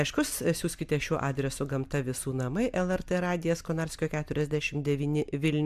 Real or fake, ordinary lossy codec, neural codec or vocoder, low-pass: real; MP3, 96 kbps; none; 14.4 kHz